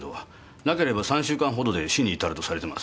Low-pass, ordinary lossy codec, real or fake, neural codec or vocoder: none; none; real; none